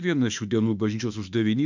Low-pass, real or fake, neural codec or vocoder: 7.2 kHz; fake; autoencoder, 48 kHz, 32 numbers a frame, DAC-VAE, trained on Japanese speech